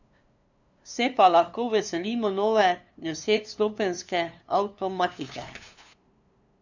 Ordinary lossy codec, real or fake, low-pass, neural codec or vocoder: none; fake; 7.2 kHz; codec, 16 kHz, 2 kbps, FunCodec, trained on LibriTTS, 25 frames a second